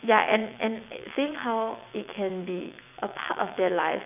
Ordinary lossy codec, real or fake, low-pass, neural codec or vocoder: none; fake; 3.6 kHz; vocoder, 22.05 kHz, 80 mel bands, WaveNeXt